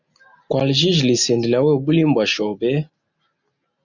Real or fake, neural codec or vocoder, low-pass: real; none; 7.2 kHz